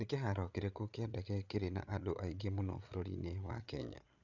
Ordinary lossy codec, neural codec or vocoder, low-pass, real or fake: none; codec, 16 kHz, 8 kbps, FreqCodec, larger model; 7.2 kHz; fake